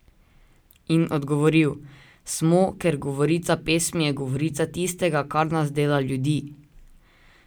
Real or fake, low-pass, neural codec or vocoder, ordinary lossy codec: real; none; none; none